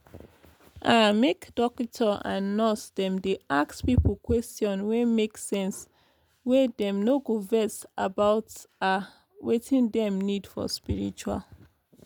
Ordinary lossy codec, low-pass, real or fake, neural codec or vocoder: none; none; real; none